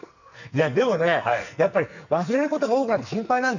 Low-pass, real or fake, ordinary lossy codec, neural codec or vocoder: 7.2 kHz; fake; none; codec, 44.1 kHz, 2.6 kbps, SNAC